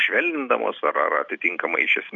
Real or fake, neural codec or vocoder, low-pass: real; none; 7.2 kHz